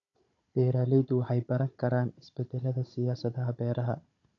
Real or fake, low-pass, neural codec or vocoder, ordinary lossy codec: fake; 7.2 kHz; codec, 16 kHz, 16 kbps, FunCodec, trained on Chinese and English, 50 frames a second; AAC, 48 kbps